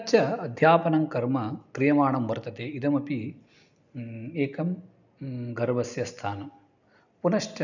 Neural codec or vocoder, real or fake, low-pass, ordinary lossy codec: none; real; 7.2 kHz; none